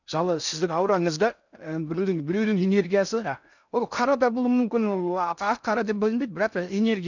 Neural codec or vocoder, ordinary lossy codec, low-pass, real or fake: codec, 16 kHz in and 24 kHz out, 0.6 kbps, FocalCodec, streaming, 4096 codes; none; 7.2 kHz; fake